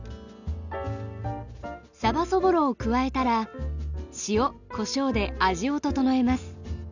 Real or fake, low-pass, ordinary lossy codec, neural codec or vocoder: real; 7.2 kHz; none; none